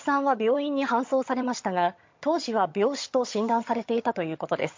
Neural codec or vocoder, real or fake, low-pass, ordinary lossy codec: vocoder, 22.05 kHz, 80 mel bands, HiFi-GAN; fake; 7.2 kHz; MP3, 48 kbps